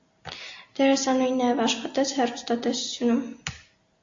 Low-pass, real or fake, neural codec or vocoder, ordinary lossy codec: 7.2 kHz; real; none; AAC, 48 kbps